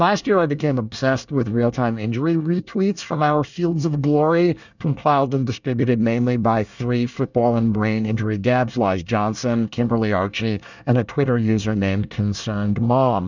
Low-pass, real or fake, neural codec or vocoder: 7.2 kHz; fake; codec, 24 kHz, 1 kbps, SNAC